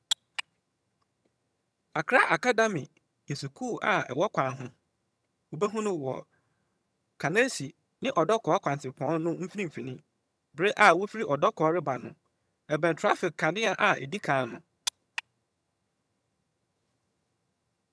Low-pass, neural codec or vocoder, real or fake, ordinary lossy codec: none; vocoder, 22.05 kHz, 80 mel bands, HiFi-GAN; fake; none